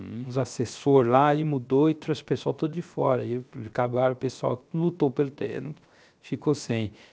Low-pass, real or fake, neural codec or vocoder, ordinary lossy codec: none; fake; codec, 16 kHz, 0.7 kbps, FocalCodec; none